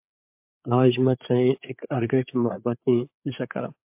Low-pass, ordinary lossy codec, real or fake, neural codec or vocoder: 3.6 kHz; MP3, 32 kbps; fake; codec, 16 kHz, 8 kbps, FreqCodec, larger model